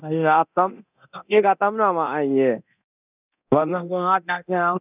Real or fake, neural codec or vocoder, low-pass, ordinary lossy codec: fake; codec, 24 kHz, 0.9 kbps, DualCodec; 3.6 kHz; none